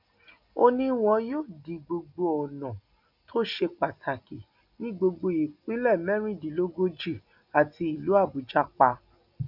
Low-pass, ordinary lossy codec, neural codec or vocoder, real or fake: 5.4 kHz; none; none; real